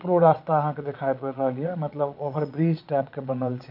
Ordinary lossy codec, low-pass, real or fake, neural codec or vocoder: none; 5.4 kHz; fake; vocoder, 22.05 kHz, 80 mel bands, Vocos